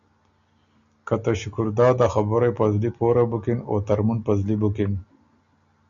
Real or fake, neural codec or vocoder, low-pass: real; none; 7.2 kHz